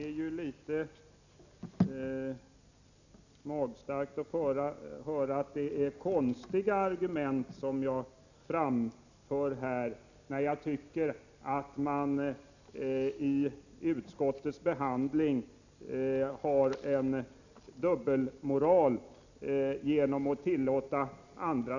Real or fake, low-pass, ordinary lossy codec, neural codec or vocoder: real; 7.2 kHz; none; none